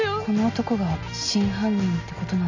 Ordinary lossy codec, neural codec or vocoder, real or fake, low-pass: MP3, 64 kbps; none; real; 7.2 kHz